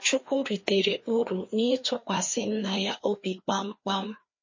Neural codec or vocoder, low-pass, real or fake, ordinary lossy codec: codec, 16 kHz, 2 kbps, FreqCodec, larger model; 7.2 kHz; fake; MP3, 32 kbps